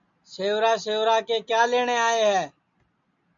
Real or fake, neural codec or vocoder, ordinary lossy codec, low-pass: real; none; MP3, 48 kbps; 7.2 kHz